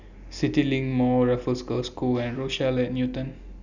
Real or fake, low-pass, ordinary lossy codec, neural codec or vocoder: real; 7.2 kHz; none; none